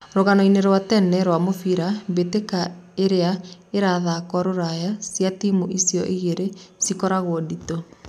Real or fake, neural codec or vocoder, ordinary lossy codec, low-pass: real; none; none; 14.4 kHz